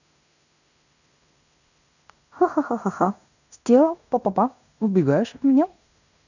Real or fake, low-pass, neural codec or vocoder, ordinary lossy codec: fake; 7.2 kHz; codec, 16 kHz in and 24 kHz out, 0.9 kbps, LongCat-Audio-Codec, fine tuned four codebook decoder; none